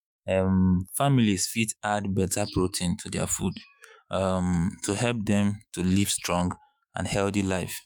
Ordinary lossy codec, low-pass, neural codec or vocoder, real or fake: none; none; autoencoder, 48 kHz, 128 numbers a frame, DAC-VAE, trained on Japanese speech; fake